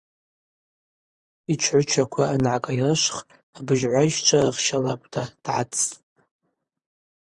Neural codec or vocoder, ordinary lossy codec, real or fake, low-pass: vocoder, 44.1 kHz, 128 mel bands, Pupu-Vocoder; Opus, 64 kbps; fake; 10.8 kHz